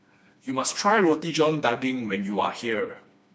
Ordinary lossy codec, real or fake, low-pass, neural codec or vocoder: none; fake; none; codec, 16 kHz, 2 kbps, FreqCodec, smaller model